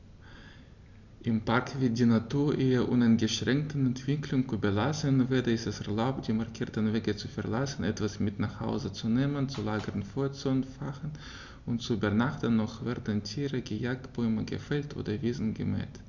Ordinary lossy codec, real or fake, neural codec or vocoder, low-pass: none; real; none; 7.2 kHz